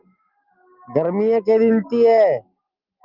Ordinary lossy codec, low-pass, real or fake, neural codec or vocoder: Opus, 24 kbps; 5.4 kHz; real; none